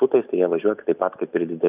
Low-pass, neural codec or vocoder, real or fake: 3.6 kHz; none; real